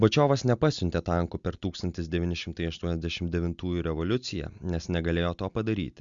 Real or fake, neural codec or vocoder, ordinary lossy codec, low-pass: real; none; Opus, 64 kbps; 7.2 kHz